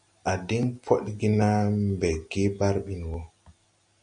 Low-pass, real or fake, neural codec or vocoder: 9.9 kHz; real; none